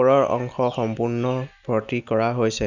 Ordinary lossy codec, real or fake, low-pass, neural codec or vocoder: none; real; 7.2 kHz; none